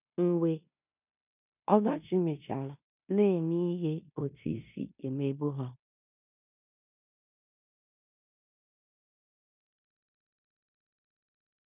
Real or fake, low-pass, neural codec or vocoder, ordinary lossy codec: fake; 3.6 kHz; codec, 16 kHz in and 24 kHz out, 0.9 kbps, LongCat-Audio-Codec, fine tuned four codebook decoder; none